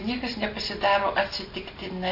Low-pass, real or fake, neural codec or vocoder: 5.4 kHz; real; none